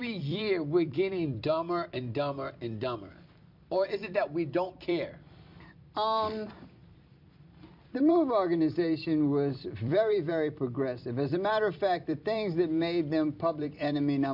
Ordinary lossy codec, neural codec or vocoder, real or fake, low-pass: MP3, 48 kbps; vocoder, 44.1 kHz, 128 mel bands every 256 samples, BigVGAN v2; fake; 5.4 kHz